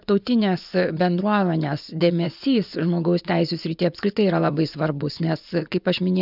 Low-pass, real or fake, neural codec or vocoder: 5.4 kHz; fake; vocoder, 24 kHz, 100 mel bands, Vocos